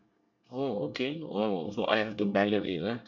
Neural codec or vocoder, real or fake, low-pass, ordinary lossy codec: codec, 24 kHz, 1 kbps, SNAC; fake; 7.2 kHz; none